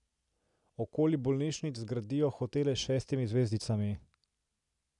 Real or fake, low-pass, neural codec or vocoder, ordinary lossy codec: real; 10.8 kHz; none; none